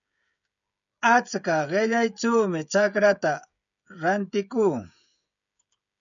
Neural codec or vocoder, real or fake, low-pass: codec, 16 kHz, 16 kbps, FreqCodec, smaller model; fake; 7.2 kHz